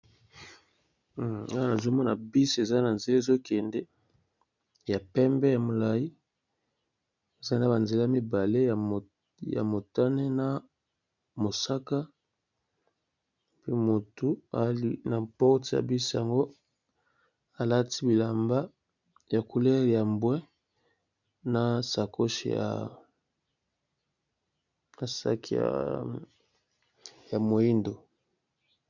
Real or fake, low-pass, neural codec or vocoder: real; 7.2 kHz; none